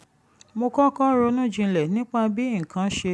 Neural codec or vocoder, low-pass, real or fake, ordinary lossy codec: none; none; real; none